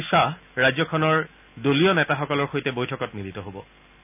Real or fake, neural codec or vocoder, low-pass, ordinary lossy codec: real; none; 3.6 kHz; none